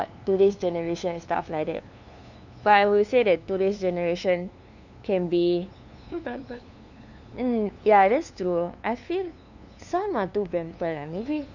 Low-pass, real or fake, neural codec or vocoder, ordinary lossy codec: 7.2 kHz; fake; codec, 16 kHz, 2 kbps, FunCodec, trained on LibriTTS, 25 frames a second; none